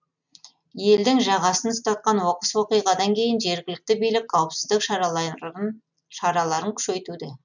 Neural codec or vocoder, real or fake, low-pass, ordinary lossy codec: none; real; 7.2 kHz; none